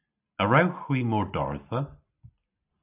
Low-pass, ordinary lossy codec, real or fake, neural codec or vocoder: 3.6 kHz; AAC, 32 kbps; real; none